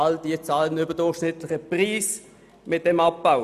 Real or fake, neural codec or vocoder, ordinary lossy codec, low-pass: real; none; none; 14.4 kHz